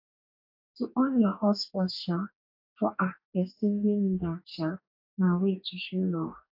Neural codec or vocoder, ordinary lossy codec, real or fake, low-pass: codec, 44.1 kHz, 2.6 kbps, DAC; AAC, 48 kbps; fake; 5.4 kHz